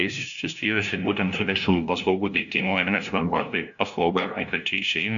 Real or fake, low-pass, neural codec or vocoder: fake; 7.2 kHz; codec, 16 kHz, 0.5 kbps, FunCodec, trained on LibriTTS, 25 frames a second